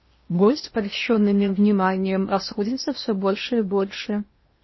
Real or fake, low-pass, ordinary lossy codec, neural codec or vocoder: fake; 7.2 kHz; MP3, 24 kbps; codec, 16 kHz in and 24 kHz out, 0.8 kbps, FocalCodec, streaming, 65536 codes